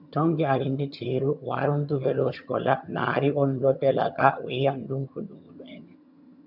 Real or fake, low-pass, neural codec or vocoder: fake; 5.4 kHz; vocoder, 22.05 kHz, 80 mel bands, HiFi-GAN